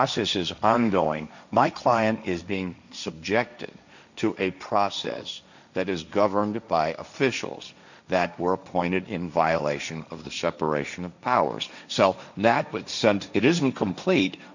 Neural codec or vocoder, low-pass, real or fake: codec, 16 kHz, 1.1 kbps, Voila-Tokenizer; 7.2 kHz; fake